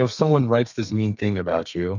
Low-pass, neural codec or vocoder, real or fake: 7.2 kHz; codec, 32 kHz, 1.9 kbps, SNAC; fake